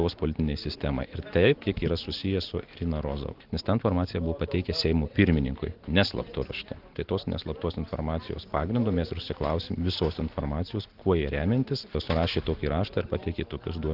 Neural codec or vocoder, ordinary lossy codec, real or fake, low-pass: none; Opus, 16 kbps; real; 5.4 kHz